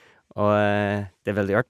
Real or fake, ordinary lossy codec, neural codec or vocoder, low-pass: real; none; none; 14.4 kHz